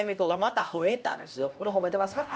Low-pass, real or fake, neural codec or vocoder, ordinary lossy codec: none; fake; codec, 16 kHz, 1 kbps, X-Codec, HuBERT features, trained on LibriSpeech; none